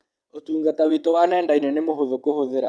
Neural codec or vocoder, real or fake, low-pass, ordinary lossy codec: vocoder, 22.05 kHz, 80 mel bands, WaveNeXt; fake; none; none